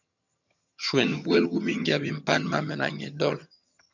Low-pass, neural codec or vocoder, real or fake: 7.2 kHz; vocoder, 22.05 kHz, 80 mel bands, HiFi-GAN; fake